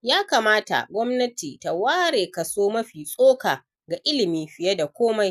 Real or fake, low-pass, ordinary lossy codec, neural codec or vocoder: real; 14.4 kHz; none; none